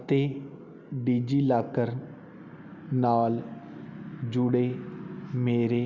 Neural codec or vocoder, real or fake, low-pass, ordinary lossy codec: none; real; 7.2 kHz; none